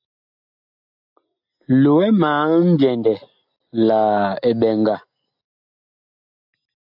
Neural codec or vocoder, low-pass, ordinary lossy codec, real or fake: none; 5.4 kHz; AAC, 48 kbps; real